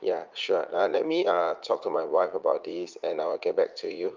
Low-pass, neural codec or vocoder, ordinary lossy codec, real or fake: 7.2 kHz; vocoder, 44.1 kHz, 80 mel bands, Vocos; Opus, 32 kbps; fake